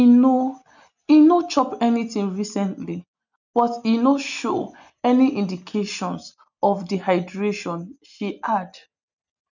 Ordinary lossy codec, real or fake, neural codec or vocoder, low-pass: none; real; none; 7.2 kHz